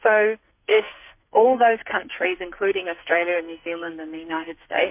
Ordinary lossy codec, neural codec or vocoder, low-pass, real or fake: MP3, 32 kbps; codec, 44.1 kHz, 2.6 kbps, SNAC; 3.6 kHz; fake